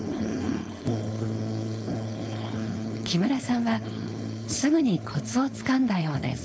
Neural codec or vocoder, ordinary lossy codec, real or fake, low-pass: codec, 16 kHz, 4.8 kbps, FACodec; none; fake; none